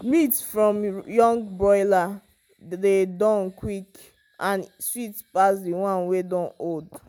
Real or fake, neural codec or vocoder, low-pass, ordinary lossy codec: real; none; none; none